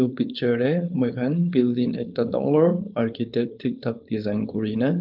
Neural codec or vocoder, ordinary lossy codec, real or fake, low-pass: codec, 16 kHz, 4.8 kbps, FACodec; Opus, 32 kbps; fake; 5.4 kHz